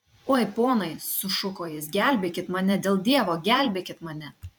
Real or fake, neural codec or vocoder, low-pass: fake; vocoder, 44.1 kHz, 128 mel bands every 512 samples, BigVGAN v2; 19.8 kHz